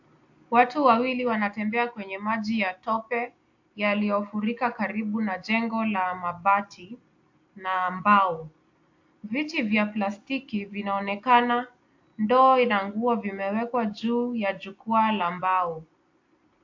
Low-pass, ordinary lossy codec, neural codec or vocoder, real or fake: 7.2 kHz; AAC, 48 kbps; none; real